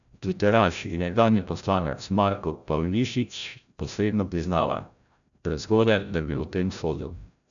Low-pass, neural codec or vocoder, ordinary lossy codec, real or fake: 7.2 kHz; codec, 16 kHz, 0.5 kbps, FreqCodec, larger model; none; fake